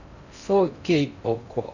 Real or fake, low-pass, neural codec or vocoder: fake; 7.2 kHz; codec, 16 kHz in and 24 kHz out, 0.6 kbps, FocalCodec, streaming, 4096 codes